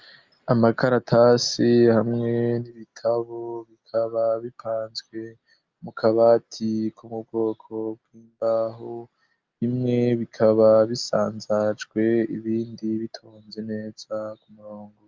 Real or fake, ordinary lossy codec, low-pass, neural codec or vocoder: real; Opus, 32 kbps; 7.2 kHz; none